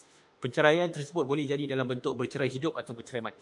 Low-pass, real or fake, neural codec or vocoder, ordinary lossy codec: 10.8 kHz; fake; autoencoder, 48 kHz, 32 numbers a frame, DAC-VAE, trained on Japanese speech; AAC, 64 kbps